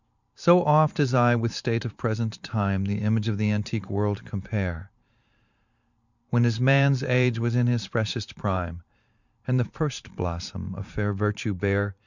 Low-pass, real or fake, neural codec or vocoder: 7.2 kHz; fake; vocoder, 44.1 kHz, 128 mel bands every 512 samples, BigVGAN v2